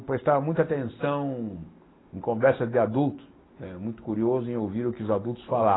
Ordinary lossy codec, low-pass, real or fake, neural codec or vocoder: AAC, 16 kbps; 7.2 kHz; real; none